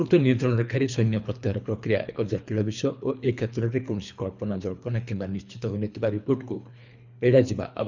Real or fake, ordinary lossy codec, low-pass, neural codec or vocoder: fake; none; 7.2 kHz; codec, 24 kHz, 3 kbps, HILCodec